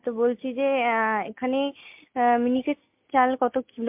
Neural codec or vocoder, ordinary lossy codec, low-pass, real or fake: none; MP3, 32 kbps; 3.6 kHz; real